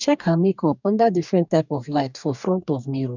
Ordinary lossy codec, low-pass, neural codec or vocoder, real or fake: none; 7.2 kHz; codec, 44.1 kHz, 2.6 kbps, DAC; fake